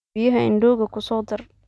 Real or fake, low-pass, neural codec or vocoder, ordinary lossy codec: real; none; none; none